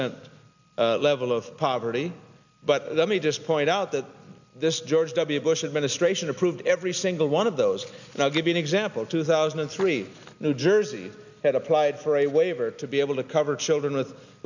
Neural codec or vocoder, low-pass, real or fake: none; 7.2 kHz; real